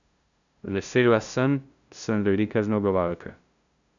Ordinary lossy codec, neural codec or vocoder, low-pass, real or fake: none; codec, 16 kHz, 0.5 kbps, FunCodec, trained on LibriTTS, 25 frames a second; 7.2 kHz; fake